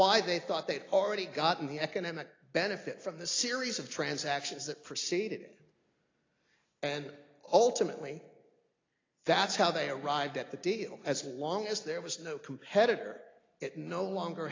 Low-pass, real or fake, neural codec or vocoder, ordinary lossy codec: 7.2 kHz; real; none; AAC, 32 kbps